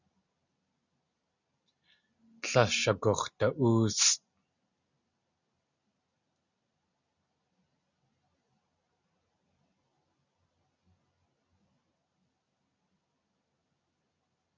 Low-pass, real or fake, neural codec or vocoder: 7.2 kHz; real; none